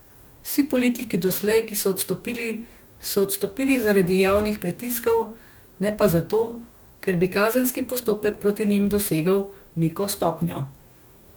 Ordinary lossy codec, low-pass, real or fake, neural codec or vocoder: none; none; fake; codec, 44.1 kHz, 2.6 kbps, DAC